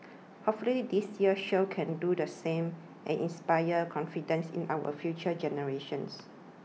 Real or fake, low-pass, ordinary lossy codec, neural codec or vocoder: real; none; none; none